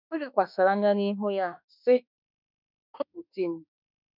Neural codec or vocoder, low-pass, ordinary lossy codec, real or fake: autoencoder, 48 kHz, 32 numbers a frame, DAC-VAE, trained on Japanese speech; 5.4 kHz; none; fake